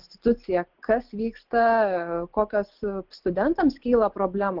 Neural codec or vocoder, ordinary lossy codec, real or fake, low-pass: none; Opus, 16 kbps; real; 5.4 kHz